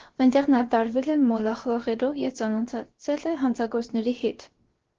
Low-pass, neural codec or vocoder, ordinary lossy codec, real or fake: 7.2 kHz; codec, 16 kHz, about 1 kbps, DyCAST, with the encoder's durations; Opus, 16 kbps; fake